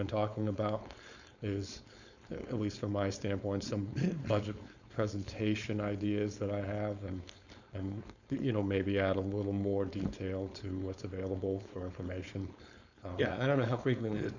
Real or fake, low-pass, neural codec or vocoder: fake; 7.2 kHz; codec, 16 kHz, 4.8 kbps, FACodec